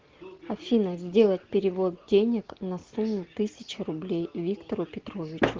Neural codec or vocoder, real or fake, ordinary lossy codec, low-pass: none; real; Opus, 16 kbps; 7.2 kHz